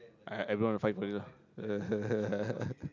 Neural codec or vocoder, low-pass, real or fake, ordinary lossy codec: none; 7.2 kHz; real; none